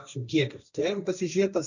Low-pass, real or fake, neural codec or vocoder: 7.2 kHz; fake; codec, 16 kHz, 1.1 kbps, Voila-Tokenizer